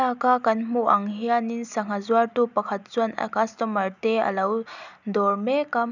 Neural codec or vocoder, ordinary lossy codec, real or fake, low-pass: none; none; real; 7.2 kHz